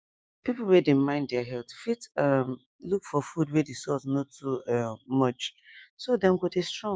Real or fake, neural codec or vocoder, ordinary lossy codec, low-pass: fake; codec, 16 kHz, 6 kbps, DAC; none; none